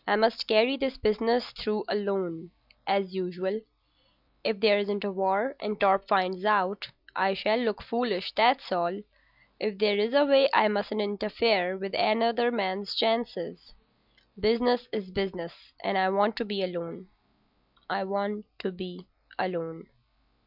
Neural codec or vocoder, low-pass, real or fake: none; 5.4 kHz; real